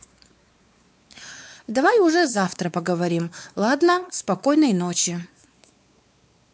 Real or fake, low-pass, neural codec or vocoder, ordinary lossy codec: fake; none; codec, 16 kHz, 4 kbps, X-Codec, WavLM features, trained on Multilingual LibriSpeech; none